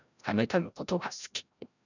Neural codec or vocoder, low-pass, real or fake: codec, 16 kHz, 0.5 kbps, FreqCodec, larger model; 7.2 kHz; fake